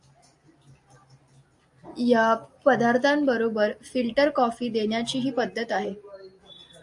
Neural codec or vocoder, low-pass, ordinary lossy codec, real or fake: none; 10.8 kHz; AAC, 64 kbps; real